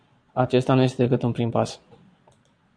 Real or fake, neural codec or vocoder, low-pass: fake; vocoder, 22.05 kHz, 80 mel bands, Vocos; 9.9 kHz